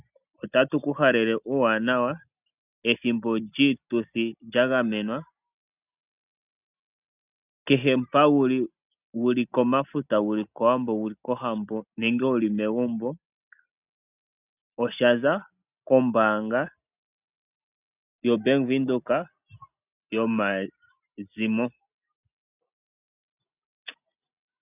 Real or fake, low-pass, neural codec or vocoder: real; 3.6 kHz; none